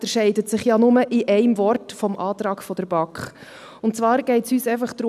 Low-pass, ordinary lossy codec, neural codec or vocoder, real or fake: 14.4 kHz; none; none; real